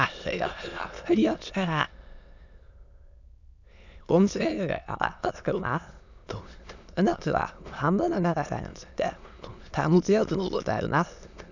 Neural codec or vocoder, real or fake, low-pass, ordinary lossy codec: autoencoder, 22.05 kHz, a latent of 192 numbers a frame, VITS, trained on many speakers; fake; 7.2 kHz; none